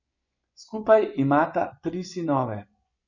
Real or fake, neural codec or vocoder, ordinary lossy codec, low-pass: fake; vocoder, 24 kHz, 100 mel bands, Vocos; none; 7.2 kHz